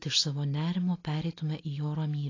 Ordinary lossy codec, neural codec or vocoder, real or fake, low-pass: AAC, 32 kbps; none; real; 7.2 kHz